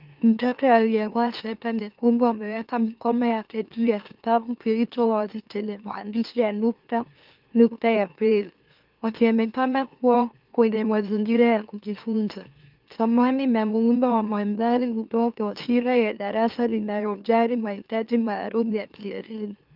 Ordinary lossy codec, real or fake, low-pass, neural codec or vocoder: Opus, 24 kbps; fake; 5.4 kHz; autoencoder, 44.1 kHz, a latent of 192 numbers a frame, MeloTTS